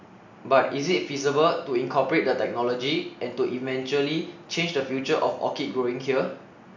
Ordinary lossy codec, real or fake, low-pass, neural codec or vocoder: none; real; 7.2 kHz; none